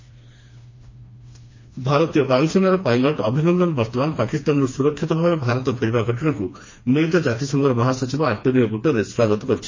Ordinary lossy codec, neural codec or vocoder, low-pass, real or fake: MP3, 32 kbps; codec, 16 kHz, 2 kbps, FreqCodec, smaller model; 7.2 kHz; fake